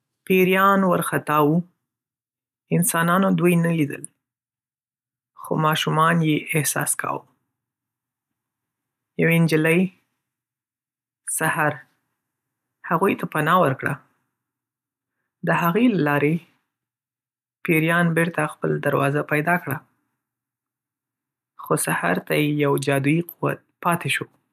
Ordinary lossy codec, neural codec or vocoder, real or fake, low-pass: none; none; real; 14.4 kHz